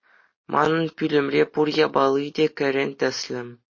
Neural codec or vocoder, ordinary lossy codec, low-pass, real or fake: none; MP3, 32 kbps; 7.2 kHz; real